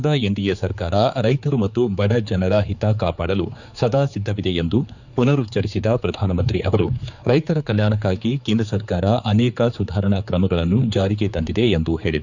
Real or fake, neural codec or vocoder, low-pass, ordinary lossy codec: fake; codec, 16 kHz, 4 kbps, X-Codec, HuBERT features, trained on general audio; 7.2 kHz; none